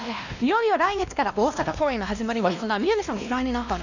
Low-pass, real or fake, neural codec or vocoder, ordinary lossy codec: 7.2 kHz; fake; codec, 16 kHz, 1 kbps, X-Codec, WavLM features, trained on Multilingual LibriSpeech; none